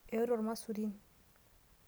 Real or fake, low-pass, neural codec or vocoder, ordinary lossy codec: real; none; none; none